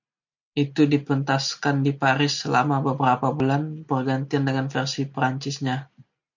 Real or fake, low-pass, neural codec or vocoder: real; 7.2 kHz; none